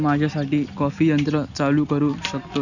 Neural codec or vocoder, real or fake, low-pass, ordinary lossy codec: none; real; 7.2 kHz; none